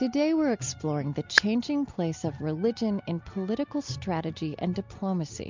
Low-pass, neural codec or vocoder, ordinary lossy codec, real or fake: 7.2 kHz; none; MP3, 64 kbps; real